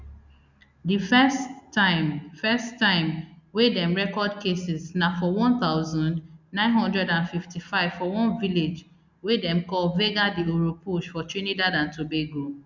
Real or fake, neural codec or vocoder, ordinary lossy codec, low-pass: real; none; none; 7.2 kHz